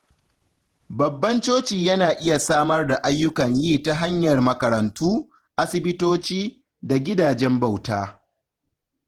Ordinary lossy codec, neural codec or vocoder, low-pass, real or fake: Opus, 16 kbps; vocoder, 48 kHz, 128 mel bands, Vocos; 19.8 kHz; fake